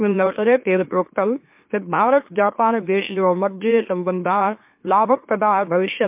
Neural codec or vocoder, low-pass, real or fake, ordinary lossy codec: autoencoder, 44.1 kHz, a latent of 192 numbers a frame, MeloTTS; 3.6 kHz; fake; MP3, 32 kbps